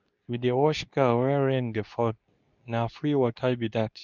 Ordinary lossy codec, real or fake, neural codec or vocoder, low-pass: none; fake; codec, 24 kHz, 0.9 kbps, WavTokenizer, medium speech release version 2; 7.2 kHz